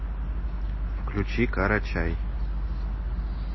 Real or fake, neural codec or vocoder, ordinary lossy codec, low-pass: real; none; MP3, 24 kbps; 7.2 kHz